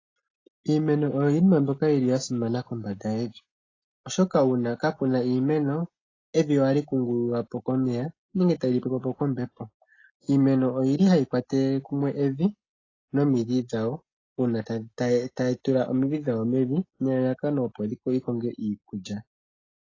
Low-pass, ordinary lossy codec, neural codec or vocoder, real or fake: 7.2 kHz; AAC, 32 kbps; none; real